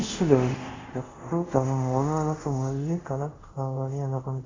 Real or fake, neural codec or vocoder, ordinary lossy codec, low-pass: fake; codec, 24 kHz, 0.5 kbps, DualCodec; AAC, 32 kbps; 7.2 kHz